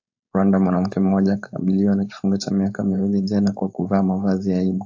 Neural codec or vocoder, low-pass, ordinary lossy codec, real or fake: codec, 16 kHz, 4.8 kbps, FACodec; 7.2 kHz; AAC, 48 kbps; fake